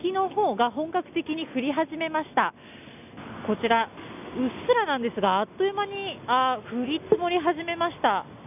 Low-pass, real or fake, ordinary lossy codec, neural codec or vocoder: 3.6 kHz; real; none; none